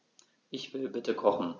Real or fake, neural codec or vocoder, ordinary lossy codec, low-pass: real; none; none; 7.2 kHz